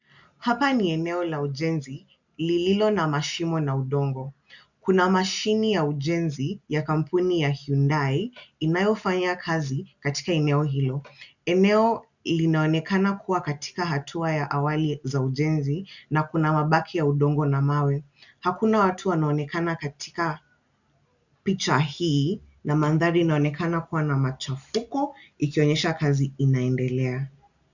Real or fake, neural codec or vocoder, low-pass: real; none; 7.2 kHz